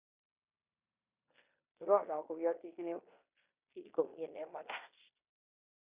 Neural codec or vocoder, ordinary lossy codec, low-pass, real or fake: codec, 16 kHz in and 24 kHz out, 0.9 kbps, LongCat-Audio-Codec, fine tuned four codebook decoder; Opus, 64 kbps; 3.6 kHz; fake